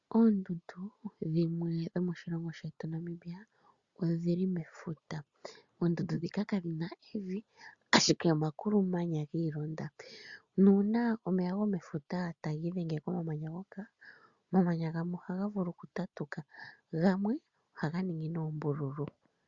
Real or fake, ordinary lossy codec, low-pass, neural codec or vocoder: real; Opus, 64 kbps; 7.2 kHz; none